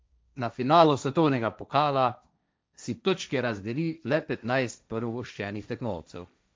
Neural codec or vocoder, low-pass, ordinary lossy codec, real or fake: codec, 16 kHz, 1.1 kbps, Voila-Tokenizer; none; none; fake